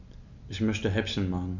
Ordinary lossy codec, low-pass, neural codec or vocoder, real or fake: none; 7.2 kHz; none; real